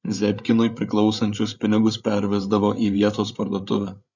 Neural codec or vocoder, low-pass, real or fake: codec, 16 kHz, 8 kbps, FreqCodec, larger model; 7.2 kHz; fake